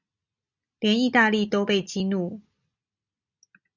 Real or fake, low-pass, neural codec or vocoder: real; 7.2 kHz; none